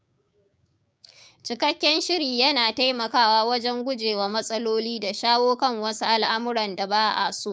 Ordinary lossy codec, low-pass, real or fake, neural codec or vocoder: none; none; fake; codec, 16 kHz, 6 kbps, DAC